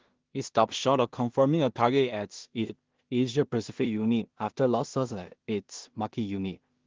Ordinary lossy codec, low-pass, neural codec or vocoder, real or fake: Opus, 16 kbps; 7.2 kHz; codec, 16 kHz in and 24 kHz out, 0.4 kbps, LongCat-Audio-Codec, two codebook decoder; fake